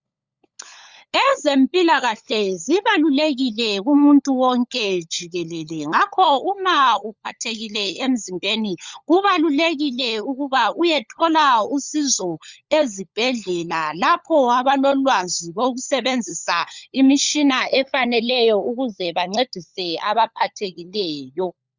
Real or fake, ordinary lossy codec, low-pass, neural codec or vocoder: fake; Opus, 64 kbps; 7.2 kHz; codec, 16 kHz, 16 kbps, FunCodec, trained on LibriTTS, 50 frames a second